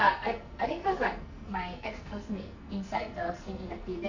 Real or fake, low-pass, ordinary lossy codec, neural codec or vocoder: fake; 7.2 kHz; none; codec, 44.1 kHz, 2.6 kbps, SNAC